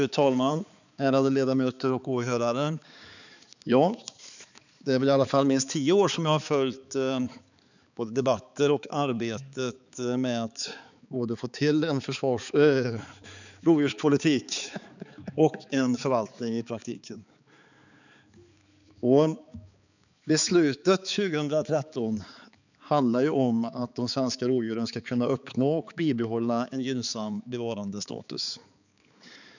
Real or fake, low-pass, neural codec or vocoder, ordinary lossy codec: fake; 7.2 kHz; codec, 16 kHz, 4 kbps, X-Codec, HuBERT features, trained on balanced general audio; none